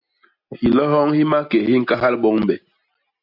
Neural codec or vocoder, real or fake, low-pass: none; real; 5.4 kHz